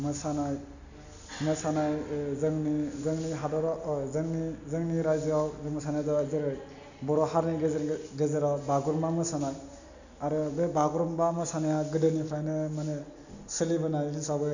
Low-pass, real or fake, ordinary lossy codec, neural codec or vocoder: 7.2 kHz; real; none; none